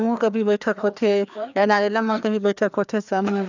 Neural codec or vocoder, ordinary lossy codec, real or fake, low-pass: codec, 16 kHz, 2 kbps, FreqCodec, larger model; none; fake; 7.2 kHz